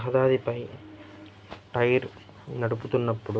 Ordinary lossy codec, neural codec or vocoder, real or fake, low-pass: none; none; real; none